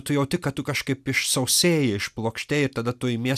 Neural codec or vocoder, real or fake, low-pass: none; real; 14.4 kHz